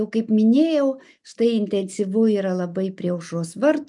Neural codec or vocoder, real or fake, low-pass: none; real; 10.8 kHz